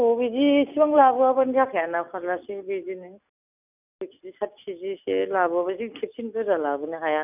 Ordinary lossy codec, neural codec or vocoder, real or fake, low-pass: none; none; real; 3.6 kHz